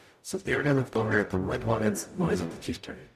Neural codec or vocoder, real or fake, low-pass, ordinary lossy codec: codec, 44.1 kHz, 0.9 kbps, DAC; fake; 14.4 kHz; none